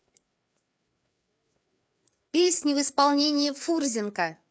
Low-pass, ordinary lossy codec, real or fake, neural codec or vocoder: none; none; fake; codec, 16 kHz, 4 kbps, FreqCodec, larger model